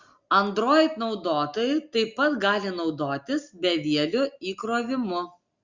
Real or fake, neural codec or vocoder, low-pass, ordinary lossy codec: real; none; 7.2 kHz; Opus, 64 kbps